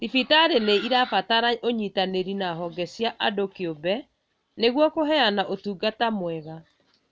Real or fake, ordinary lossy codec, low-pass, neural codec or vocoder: real; none; none; none